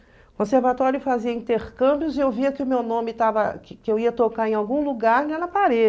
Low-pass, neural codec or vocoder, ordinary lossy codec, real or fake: none; none; none; real